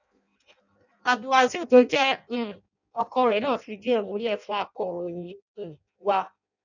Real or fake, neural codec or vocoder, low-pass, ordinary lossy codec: fake; codec, 16 kHz in and 24 kHz out, 0.6 kbps, FireRedTTS-2 codec; 7.2 kHz; none